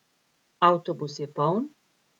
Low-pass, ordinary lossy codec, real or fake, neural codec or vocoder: 19.8 kHz; none; fake; vocoder, 48 kHz, 128 mel bands, Vocos